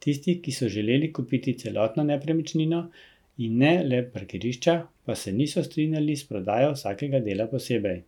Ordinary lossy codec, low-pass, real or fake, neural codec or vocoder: none; 19.8 kHz; real; none